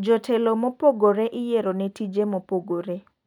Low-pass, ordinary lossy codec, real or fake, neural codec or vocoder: 19.8 kHz; none; real; none